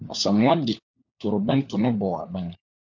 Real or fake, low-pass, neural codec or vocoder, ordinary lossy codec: fake; 7.2 kHz; codec, 16 kHz, 4 kbps, FunCodec, trained on LibriTTS, 50 frames a second; MP3, 64 kbps